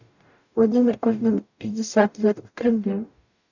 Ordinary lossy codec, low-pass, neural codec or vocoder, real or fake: none; 7.2 kHz; codec, 44.1 kHz, 0.9 kbps, DAC; fake